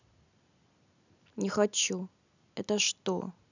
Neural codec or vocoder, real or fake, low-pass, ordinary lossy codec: none; real; 7.2 kHz; none